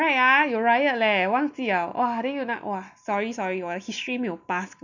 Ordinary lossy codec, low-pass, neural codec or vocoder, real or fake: none; 7.2 kHz; none; real